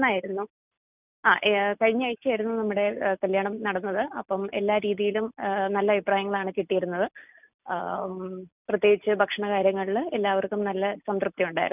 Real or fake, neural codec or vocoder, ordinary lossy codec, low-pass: real; none; none; 3.6 kHz